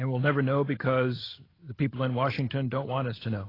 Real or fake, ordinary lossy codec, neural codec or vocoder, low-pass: real; AAC, 24 kbps; none; 5.4 kHz